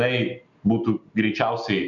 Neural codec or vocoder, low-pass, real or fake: none; 7.2 kHz; real